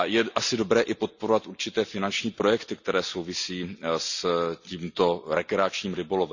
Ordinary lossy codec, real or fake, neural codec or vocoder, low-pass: none; real; none; 7.2 kHz